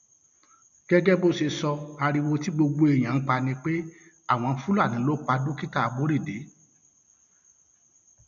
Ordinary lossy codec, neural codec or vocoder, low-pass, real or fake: AAC, 96 kbps; none; 7.2 kHz; real